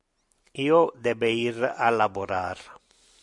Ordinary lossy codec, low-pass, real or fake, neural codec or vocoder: AAC, 64 kbps; 10.8 kHz; real; none